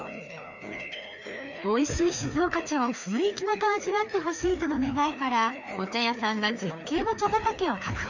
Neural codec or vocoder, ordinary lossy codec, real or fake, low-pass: codec, 16 kHz, 2 kbps, FreqCodec, larger model; none; fake; 7.2 kHz